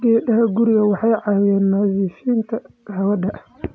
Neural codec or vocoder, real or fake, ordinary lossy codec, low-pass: none; real; none; none